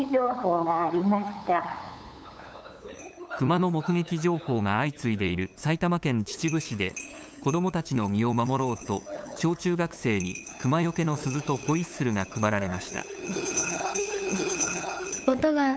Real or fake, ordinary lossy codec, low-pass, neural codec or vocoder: fake; none; none; codec, 16 kHz, 8 kbps, FunCodec, trained on LibriTTS, 25 frames a second